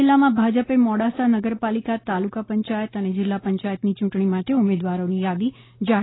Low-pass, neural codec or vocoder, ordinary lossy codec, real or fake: 7.2 kHz; none; AAC, 16 kbps; real